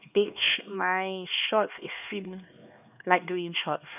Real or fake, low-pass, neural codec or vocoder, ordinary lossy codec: fake; 3.6 kHz; codec, 16 kHz, 2 kbps, X-Codec, HuBERT features, trained on LibriSpeech; none